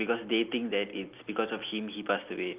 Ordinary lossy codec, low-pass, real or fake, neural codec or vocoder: Opus, 24 kbps; 3.6 kHz; real; none